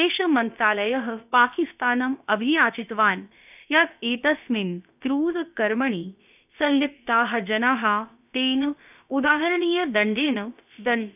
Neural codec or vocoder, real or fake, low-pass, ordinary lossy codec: codec, 16 kHz, about 1 kbps, DyCAST, with the encoder's durations; fake; 3.6 kHz; none